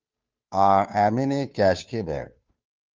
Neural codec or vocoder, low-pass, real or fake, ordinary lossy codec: codec, 16 kHz, 2 kbps, FunCodec, trained on Chinese and English, 25 frames a second; 7.2 kHz; fake; Opus, 24 kbps